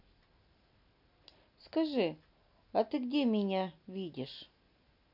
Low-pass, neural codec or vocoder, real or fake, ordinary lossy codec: 5.4 kHz; none; real; none